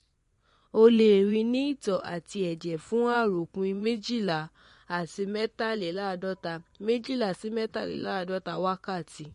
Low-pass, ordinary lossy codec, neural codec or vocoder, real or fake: 10.8 kHz; MP3, 48 kbps; vocoder, 24 kHz, 100 mel bands, Vocos; fake